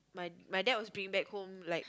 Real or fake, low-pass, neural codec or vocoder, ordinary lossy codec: real; none; none; none